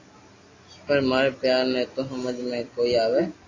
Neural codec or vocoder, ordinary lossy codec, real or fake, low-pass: none; AAC, 32 kbps; real; 7.2 kHz